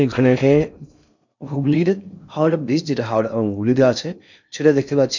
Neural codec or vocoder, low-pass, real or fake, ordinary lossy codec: codec, 16 kHz in and 24 kHz out, 0.6 kbps, FocalCodec, streaming, 2048 codes; 7.2 kHz; fake; none